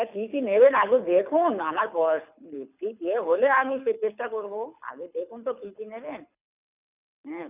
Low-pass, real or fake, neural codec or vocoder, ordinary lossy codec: 3.6 kHz; fake; codec, 24 kHz, 6 kbps, HILCodec; none